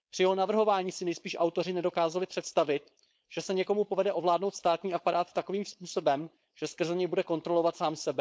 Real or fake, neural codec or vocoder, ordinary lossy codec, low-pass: fake; codec, 16 kHz, 4.8 kbps, FACodec; none; none